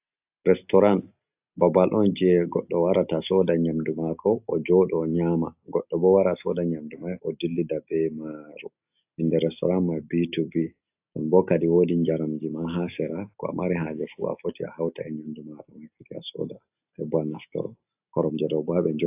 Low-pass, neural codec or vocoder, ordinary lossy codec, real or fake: 3.6 kHz; none; AAC, 32 kbps; real